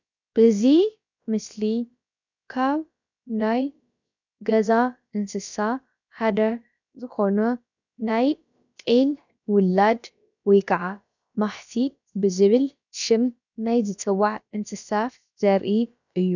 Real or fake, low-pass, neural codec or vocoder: fake; 7.2 kHz; codec, 16 kHz, about 1 kbps, DyCAST, with the encoder's durations